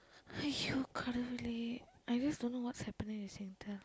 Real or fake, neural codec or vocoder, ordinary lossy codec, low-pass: real; none; none; none